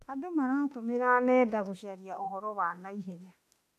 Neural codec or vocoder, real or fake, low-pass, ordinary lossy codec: autoencoder, 48 kHz, 32 numbers a frame, DAC-VAE, trained on Japanese speech; fake; 14.4 kHz; AAC, 64 kbps